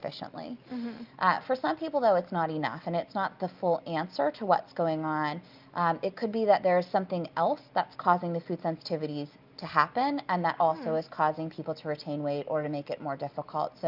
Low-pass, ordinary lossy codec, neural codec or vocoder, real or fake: 5.4 kHz; Opus, 24 kbps; none; real